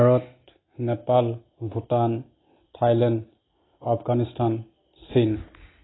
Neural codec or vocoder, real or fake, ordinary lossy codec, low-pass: none; real; AAC, 16 kbps; 7.2 kHz